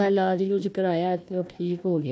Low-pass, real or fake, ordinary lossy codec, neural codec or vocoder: none; fake; none; codec, 16 kHz, 1 kbps, FunCodec, trained on Chinese and English, 50 frames a second